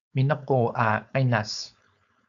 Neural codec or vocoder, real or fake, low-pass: codec, 16 kHz, 4.8 kbps, FACodec; fake; 7.2 kHz